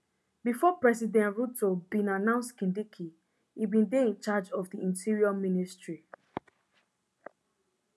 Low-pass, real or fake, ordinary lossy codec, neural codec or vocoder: none; real; none; none